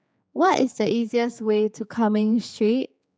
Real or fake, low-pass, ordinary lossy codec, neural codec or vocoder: fake; none; none; codec, 16 kHz, 4 kbps, X-Codec, HuBERT features, trained on general audio